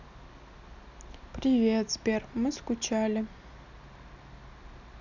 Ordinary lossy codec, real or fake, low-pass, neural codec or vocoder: none; real; 7.2 kHz; none